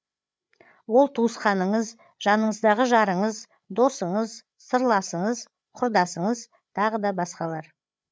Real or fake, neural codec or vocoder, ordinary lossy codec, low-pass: fake; codec, 16 kHz, 8 kbps, FreqCodec, larger model; none; none